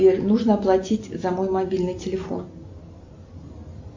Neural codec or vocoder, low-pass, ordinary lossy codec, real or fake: none; 7.2 kHz; MP3, 48 kbps; real